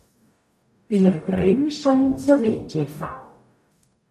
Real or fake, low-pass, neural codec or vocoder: fake; 14.4 kHz; codec, 44.1 kHz, 0.9 kbps, DAC